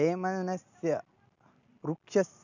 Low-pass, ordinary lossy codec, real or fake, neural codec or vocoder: 7.2 kHz; none; real; none